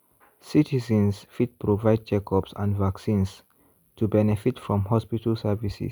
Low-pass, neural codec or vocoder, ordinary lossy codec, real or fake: none; none; none; real